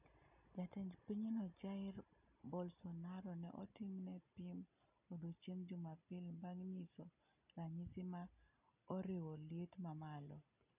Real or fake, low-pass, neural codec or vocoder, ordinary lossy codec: real; 3.6 kHz; none; none